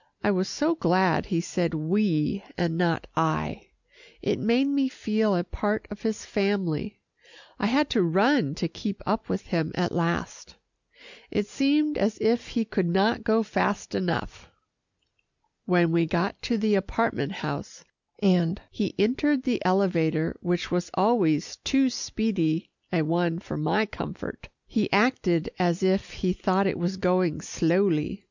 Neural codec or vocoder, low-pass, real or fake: none; 7.2 kHz; real